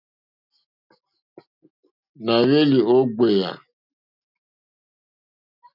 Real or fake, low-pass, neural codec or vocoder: real; 5.4 kHz; none